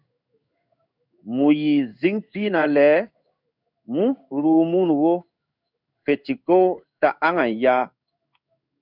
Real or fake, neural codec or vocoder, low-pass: fake; codec, 16 kHz in and 24 kHz out, 1 kbps, XY-Tokenizer; 5.4 kHz